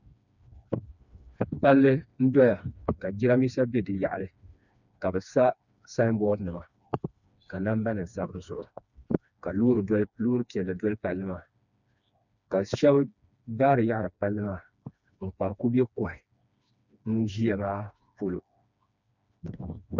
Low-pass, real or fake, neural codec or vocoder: 7.2 kHz; fake; codec, 16 kHz, 2 kbps, FreqCodec, smaller model